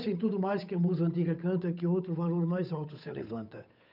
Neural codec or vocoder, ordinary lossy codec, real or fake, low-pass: codec, 16 kHz, 8 kbps, FunCodec, trained on Chinese and English, 25 frames a second; none; fake; 5.4 kHz